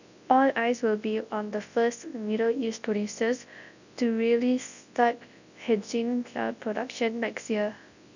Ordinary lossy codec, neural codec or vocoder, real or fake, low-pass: none; codec, 24 kHz, 0.9 kbps, WavTokenizer, large speech release; fake; 7.2 kHz